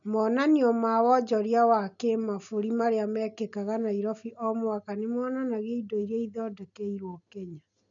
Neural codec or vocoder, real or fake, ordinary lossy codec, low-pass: none; real; none; 7.2 kHz